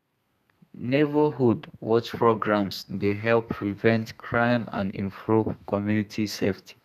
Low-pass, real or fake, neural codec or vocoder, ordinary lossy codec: 14.4 kHz; fake; codec, 32 kHz, 1.9 kbps, SNAC; none